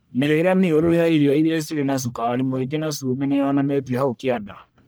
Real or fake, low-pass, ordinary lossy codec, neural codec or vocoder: fake; none; none; codec, 44.1 kHz, 1.7 kbps, Pupu-Codec